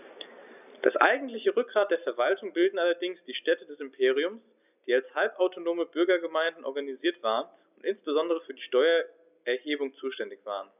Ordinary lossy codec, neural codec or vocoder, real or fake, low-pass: none; none; real; 3.6 kHz